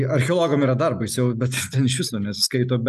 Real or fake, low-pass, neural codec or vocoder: real; 14.4 kHz; none